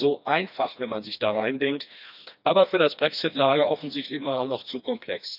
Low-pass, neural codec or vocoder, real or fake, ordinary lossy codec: 5.4 kHz; codec, 16 kHz, 2 kbps, FreqCodec, smaller model; fake; none